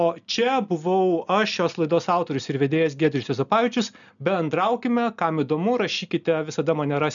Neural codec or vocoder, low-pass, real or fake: none; 7.2 kHz; real